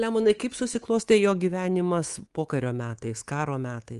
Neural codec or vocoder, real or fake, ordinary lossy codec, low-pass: codec, 24 kHz, 3.1 kbps, DualCodec; fake; Opus, 24 kbps; 10.8 kHz